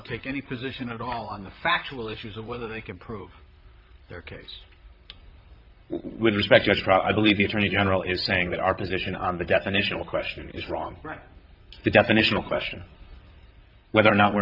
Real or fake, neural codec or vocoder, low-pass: fake; vocoder, 44.1 kHz, 128 mel bands, Pupu-Vocoder; 5.4 kHz